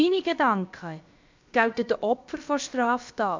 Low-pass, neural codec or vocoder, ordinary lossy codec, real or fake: 7.2 kHz; codec, 16 kHz, about 1 kbps, DyCAST, with the encoder's durations; MP3, 64 kbps; fake